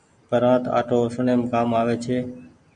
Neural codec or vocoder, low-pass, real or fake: none; 9.9 kHz; real